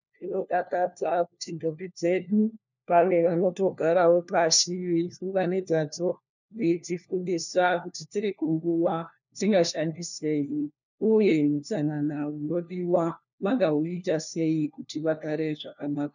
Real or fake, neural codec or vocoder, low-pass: fake; codec, 16 kHz, 1 kbps, FunCodec, trained on LibriTTS, 50 frames a second; 7.2 kHz